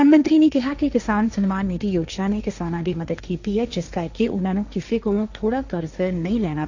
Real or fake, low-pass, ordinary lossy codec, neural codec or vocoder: fake; 7.2 kHz; none; codec, 16 kHz, 1.1 kbps, Voila-Tokenizer